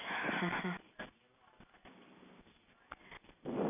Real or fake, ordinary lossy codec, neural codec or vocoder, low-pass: real; none; none; 3.6 kHz